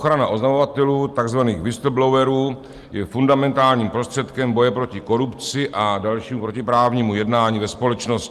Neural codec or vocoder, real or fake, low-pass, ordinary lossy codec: none; real; 14.4 kHz; Opus, 32 kbps